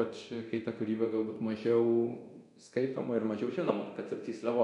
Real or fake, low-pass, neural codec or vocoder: fake; 10.8 kHz; codec, 24 kHz, 0.9 kbps, DualCodec